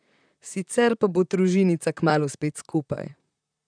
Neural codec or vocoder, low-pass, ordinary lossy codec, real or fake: vocoder, 44.1 kHz, 128 mel bands, Pupu-Vocoder; 9.9 kHz; none; fake